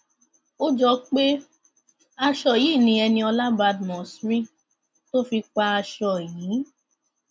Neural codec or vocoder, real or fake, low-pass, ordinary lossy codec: none; real; none; none